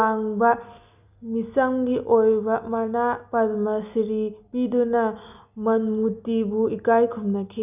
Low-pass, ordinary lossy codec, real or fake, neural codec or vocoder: 3.6 kHz; none; real; none